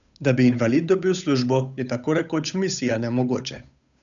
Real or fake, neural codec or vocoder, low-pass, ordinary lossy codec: fake; codec, 16 kHz, 8 kbps, FunCodec, trained on Chinese and English, 25 frames a second; 7.2 kHz; none